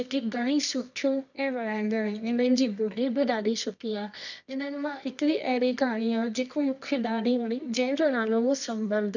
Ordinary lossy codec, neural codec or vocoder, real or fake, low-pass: none; codec, 24 kHz, 0.9 kbps, WavTokenizer, medium music audio release; fake; 7.2 kHz